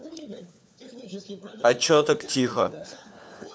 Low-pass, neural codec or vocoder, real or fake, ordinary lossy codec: none; codec, 16 kHz, 4 kbps, FunCodec, trained on LibriTTS, 50 frames a second; fake; none